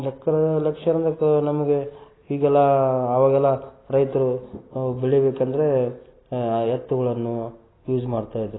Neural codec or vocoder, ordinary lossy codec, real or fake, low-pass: none; AAC, 16 kbps; real; 7.2 kHz